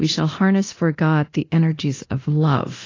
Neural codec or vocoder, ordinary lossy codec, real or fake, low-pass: codec, 24 kHz, 0.9 kbps, DualCodec; AAC, 32 kbps; fake; 7.2 kHz